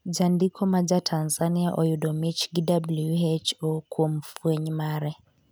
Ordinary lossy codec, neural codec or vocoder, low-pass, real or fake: none; none; none; real